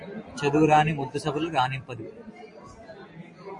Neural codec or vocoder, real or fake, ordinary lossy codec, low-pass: none; real; MP3, 48 kbps; 10.8 kHz